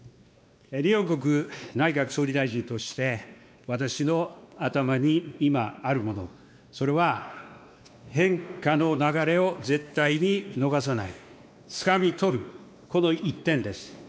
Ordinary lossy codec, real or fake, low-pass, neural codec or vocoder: none; fake; none; codec, 16 kHz, 2 kbps, X-Codec, WavLM features, trained on Multilingual LibriSpeech